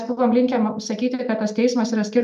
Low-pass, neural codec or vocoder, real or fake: 14.4 kHz; none; real